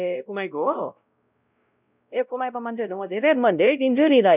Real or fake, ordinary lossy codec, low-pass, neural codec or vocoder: fake; none; 3.6 kHz; codec, 16 kHz, 0.5 kbps, X-Codec, WavLM features, trained on Multilingual LibriSpeech